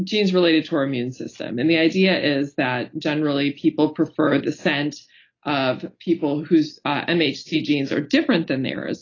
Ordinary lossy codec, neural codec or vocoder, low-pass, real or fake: AAC, 32 kbps; none; 7.2 kHz; real